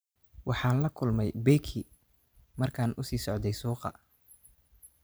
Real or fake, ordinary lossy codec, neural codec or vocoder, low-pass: fake; none; vocoder, 44.1 kHz, 128 mel bands every 512 samples, BigVGAN v2; none